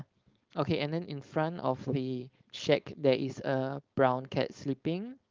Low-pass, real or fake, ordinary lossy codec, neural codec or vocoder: 7.2 kHz; fake; Opus, 32 kbps; codec, 16 kHz, 4.8 kbps, FACodec